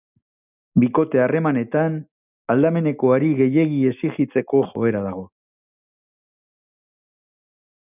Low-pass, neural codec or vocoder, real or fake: 3.6 kHz; none; real